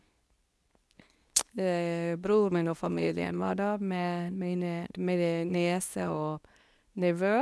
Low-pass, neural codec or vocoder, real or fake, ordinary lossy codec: none; codec, 24 kHz, 0.9 kbps, WavTokenizer, medium speech release version 2; fake; none